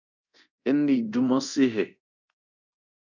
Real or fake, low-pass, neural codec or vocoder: fake; 7.2 kHz; codec, 24 kHz, 0.9 kbps, DualCodec